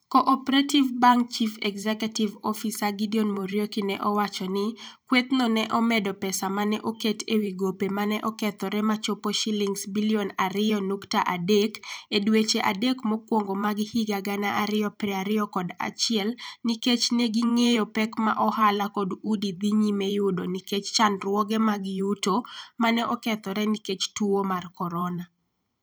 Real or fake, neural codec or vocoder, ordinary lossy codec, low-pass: fake; vocoder, 44.1 kHz, 128 mel bands every 512 samples, BigVGAN v2; none; none